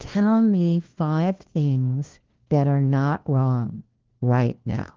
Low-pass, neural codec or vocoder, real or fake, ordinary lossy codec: 7.2 kHz; codec, 16 kHz, 1 kbps, FunCodec, trained on LibriTTS, 50 frames a second; fake; Opus, 16 kbps